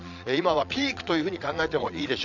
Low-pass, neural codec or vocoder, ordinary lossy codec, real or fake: 7.2 kHz; vocoder, 22.05 kHz, 80 mel bands, Vocos; none; fake